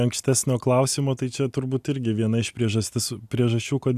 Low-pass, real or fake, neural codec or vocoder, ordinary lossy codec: 14.4 kHz; real; none; AAC, 96 kbps